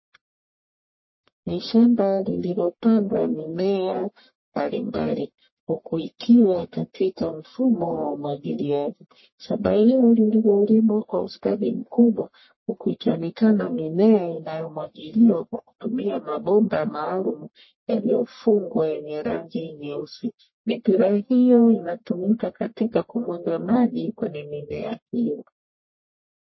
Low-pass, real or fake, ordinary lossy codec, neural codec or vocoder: 7.2 kHz; fake; MP3, 24 kbps; codec, 44.1 kHz, 1.7 kbps, Pupu-Codec